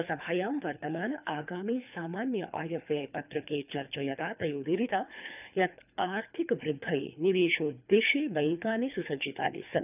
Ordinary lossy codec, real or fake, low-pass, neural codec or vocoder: none; fake; 3.6 kHz; codec, 24 kHz, 3 kbps, HILCodec